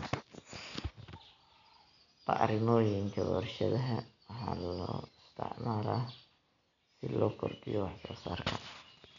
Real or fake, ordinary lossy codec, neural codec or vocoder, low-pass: real; MP3, 96 kbps; none; 7.2 kHz